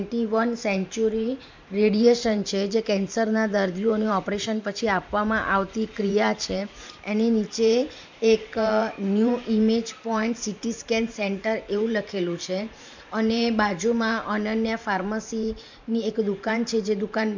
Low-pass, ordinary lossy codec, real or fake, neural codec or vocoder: 7.2 kHz; MP3, 64 kbps; fake; vocoder, 44.1 kHz, 128 mel bands every 512 samples, BigVGAN v2